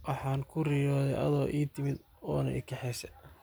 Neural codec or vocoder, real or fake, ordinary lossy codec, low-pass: none; real; none; none